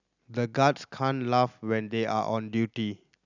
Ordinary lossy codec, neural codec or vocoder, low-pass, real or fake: none; none; 7.2 kHz; real